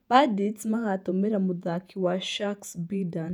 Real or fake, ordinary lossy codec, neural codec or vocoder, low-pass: fake; none; vocoder, 48 kHz, 128 mel bands, Vocos; 19.8 kHz